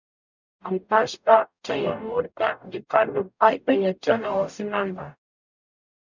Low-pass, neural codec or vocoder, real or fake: 7.2 kHz; codec, 44.1 kHz, 0.9 kbps, DAC; fake